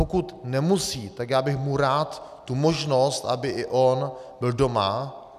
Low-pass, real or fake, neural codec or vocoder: 14.4 kHz; real; none